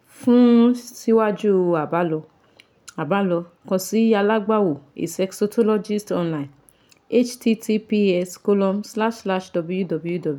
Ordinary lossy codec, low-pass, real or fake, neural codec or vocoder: none; 19.8 kHz; real; none